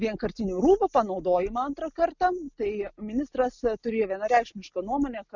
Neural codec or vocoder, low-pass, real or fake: none; 7.2 kHz; real